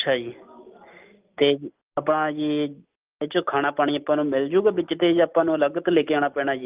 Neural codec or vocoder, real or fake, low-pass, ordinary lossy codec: none; real; 3.6 kHz; Opus, 32 kbps